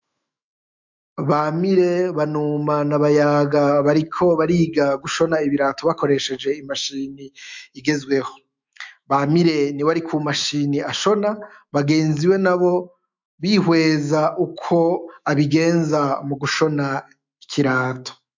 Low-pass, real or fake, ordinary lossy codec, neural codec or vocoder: 7.2 kHz; fake; MP3, 64 kbps; autoencoder, 48 kHz, 128 numbers a frame, DAC-VAE, trained on Japanese speech